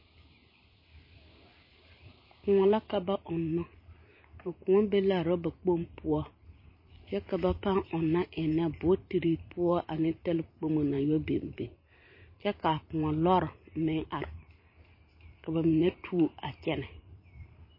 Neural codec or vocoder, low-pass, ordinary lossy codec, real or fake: vocoder, 44.1 kHz, 128 mel bands every 512 samples, BigVGAN v2; 5.4 kHz; MP3, 24 kbps; fake